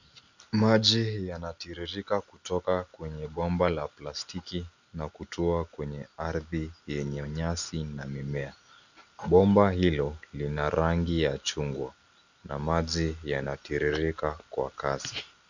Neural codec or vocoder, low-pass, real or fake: none; 7.2 kHz; real